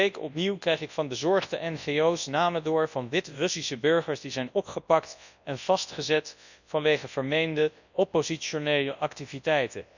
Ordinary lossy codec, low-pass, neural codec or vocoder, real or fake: none; 7.2 kHz; codec, 24 kHz, 0.9 kbps, WavTokenizer, large speech release; fake